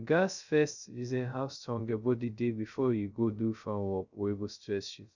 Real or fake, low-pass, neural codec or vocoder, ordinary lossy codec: fake; 7.2 kHz; codec, 16 kHz, 0.2 kbps, FocalCodec; none